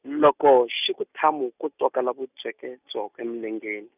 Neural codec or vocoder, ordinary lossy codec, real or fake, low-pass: none; none; real; 3.6 kHz